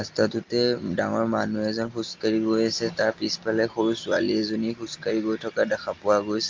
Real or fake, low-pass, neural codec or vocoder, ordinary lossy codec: real; 7.2 kHz; none; Opus, 24 kbps